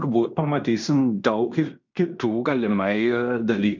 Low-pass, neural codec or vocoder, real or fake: 7.2 kHz; codec, 16 kHz in and 24 kHz out, 0.9 kbps, LongCat-Audio-Codec, fine tuned four codebook decoder; fake